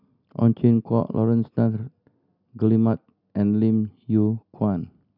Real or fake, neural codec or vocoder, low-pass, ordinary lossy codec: real; none; 5.4 kHz; none